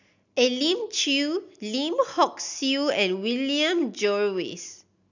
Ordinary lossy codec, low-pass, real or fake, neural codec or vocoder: none; 7.2 kHz; real; none